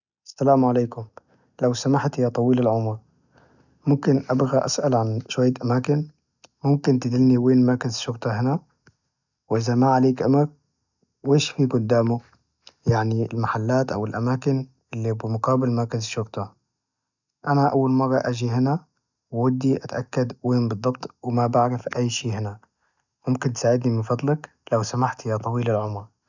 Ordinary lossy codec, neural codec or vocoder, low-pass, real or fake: none; none; 7.2 kHz; real